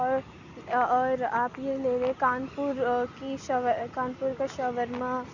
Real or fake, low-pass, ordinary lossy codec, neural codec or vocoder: real; 7.2 kHz; none; none